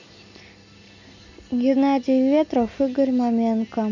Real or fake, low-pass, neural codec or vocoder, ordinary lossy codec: real; 7.2 kHz; none; none